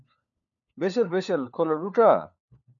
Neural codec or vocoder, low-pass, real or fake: codec, 16 kHz, 4 kbps, FunCodec, trained on LibriTTS, 50 frames a second; 7.2 kHz; fake